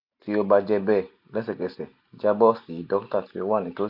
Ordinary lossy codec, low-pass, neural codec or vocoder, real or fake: AAC, 48 kbps; 5.4 kHz; none; real